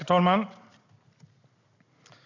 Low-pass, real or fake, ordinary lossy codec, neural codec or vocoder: 7.2 kHz; real; none; none